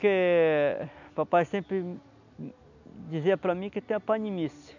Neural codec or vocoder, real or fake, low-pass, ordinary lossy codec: none; real; 7.2 kHz; none